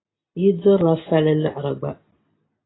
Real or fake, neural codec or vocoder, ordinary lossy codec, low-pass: real; none; AAC, 16 kbps; 7.2 kHz